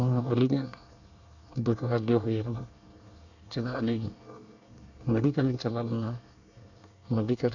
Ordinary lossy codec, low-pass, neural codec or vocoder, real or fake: none; 7.2 kHz; codec, 24 kHz, 1 kbps, SNAC; fake